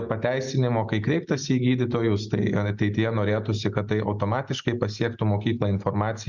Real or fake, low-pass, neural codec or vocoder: real; 7.2 kHz; none